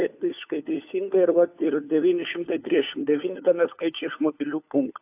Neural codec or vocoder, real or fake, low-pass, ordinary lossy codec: codec, 16 kHz, 4 kbps, FunCodec, trained on LibriTTS, 50 frames a second; fake; 3.6 kHz; AAC, 32 kbps